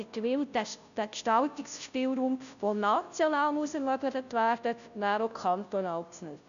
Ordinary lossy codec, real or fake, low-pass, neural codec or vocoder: none; fake; 7.2 kHz; codec, 16 kHz, 0.5 kbps, FunCodec, trained on Chinese and English, 25 frames a second